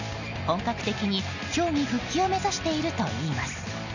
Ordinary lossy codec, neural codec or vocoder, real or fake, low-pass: none; none; real; 7.2 kHz